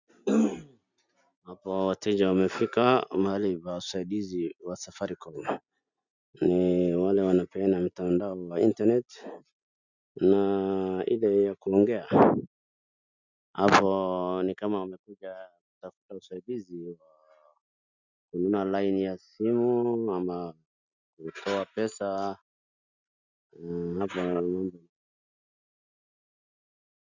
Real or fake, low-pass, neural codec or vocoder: real; 7.2 kHz; none